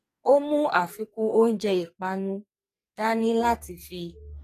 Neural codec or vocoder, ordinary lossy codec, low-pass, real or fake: codec, 44.1 kHz, 2.6 kbps, SNAC; AAC, 48 kbps; 14.4 kHz; fake